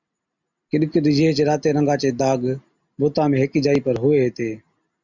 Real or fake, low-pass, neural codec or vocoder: real; 7.2 kHz; none